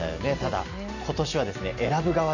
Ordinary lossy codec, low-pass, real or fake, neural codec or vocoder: none; 7.2 kHz; real; none